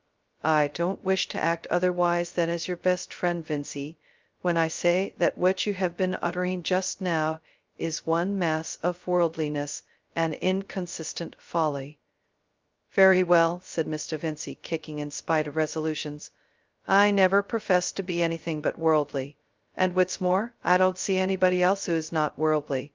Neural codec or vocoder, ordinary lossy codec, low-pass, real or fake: codec, 16 kHz, 0.2 kbps, FocalCodec; Opus, 24 kbps; 7.2 kHz; fake